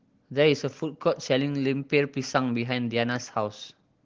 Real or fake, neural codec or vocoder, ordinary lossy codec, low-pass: real; none; Opus, 16 kbps; 7.2 kHz